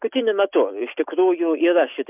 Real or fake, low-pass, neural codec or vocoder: real; 3.6 kHz; none